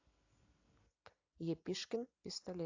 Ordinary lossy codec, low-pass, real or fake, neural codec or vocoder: AAC, 48 kbps; 7.2 kHz; real; none